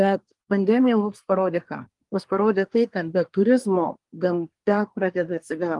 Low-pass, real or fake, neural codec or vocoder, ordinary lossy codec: 10.8 kHz; fake; codec, 24 kHz, 1 kbps, SNAC; Opus, 24 kbps